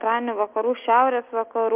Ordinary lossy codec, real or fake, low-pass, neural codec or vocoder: Opus, 32 kbps; real; 3.6 kHz; none